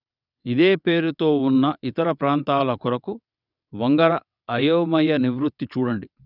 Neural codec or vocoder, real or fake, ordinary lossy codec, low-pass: vocoder, 22.05 kHz, 80 mel bands, WaveNeXt; fake; none; 5.4 kHz